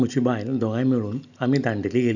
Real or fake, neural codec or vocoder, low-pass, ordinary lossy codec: real; none; 7.2 kHz; none